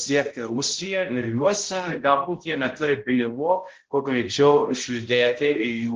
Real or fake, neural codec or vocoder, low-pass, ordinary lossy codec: fake; codec, 16 kHz, 0.5 kbps, X-Codec, HuBERT features, trained on balanced general audio; 7.2 kHz; Opus, 16 kbps